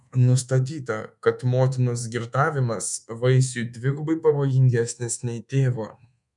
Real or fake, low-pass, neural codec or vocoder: fake; 10.8 kHz; codec, 24 kHz, 1.2 kbps, DualCodec